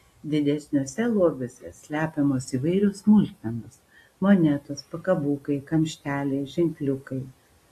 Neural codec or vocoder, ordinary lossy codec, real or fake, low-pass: none; AAC, 48 kbps; real; 14.4 kHz